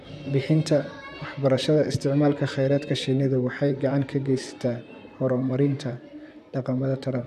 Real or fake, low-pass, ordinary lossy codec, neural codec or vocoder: fake; 14.4 kHz; none; vocoder, 44.1 kHz, 128 mel bands, Pupu-Vocoder